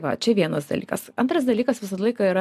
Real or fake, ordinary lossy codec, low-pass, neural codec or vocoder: real; MP3, 96 kbps; 14.4 kHz; none